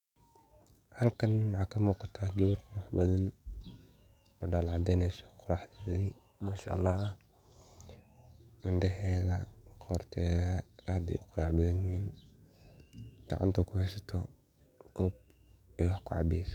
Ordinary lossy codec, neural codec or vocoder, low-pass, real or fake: none; codec, 44.1 kHz, 7.8 kbps, DAC; 19.8 kHz; fake